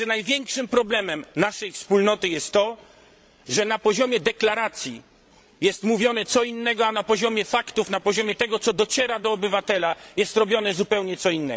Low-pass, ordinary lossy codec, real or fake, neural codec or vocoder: none; none; fake; codec, 16 kHz, 16 kbps, FreqCodec, larger model